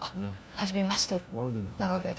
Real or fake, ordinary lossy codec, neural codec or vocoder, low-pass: fake; none; codec, 16 kHz, 1 kbps, FunCodec, trained on LibriTTS, 50 frames a second; none